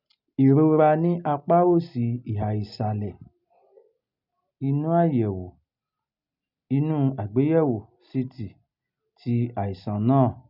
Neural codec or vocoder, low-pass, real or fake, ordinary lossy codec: none; 5.4 kHz; real; none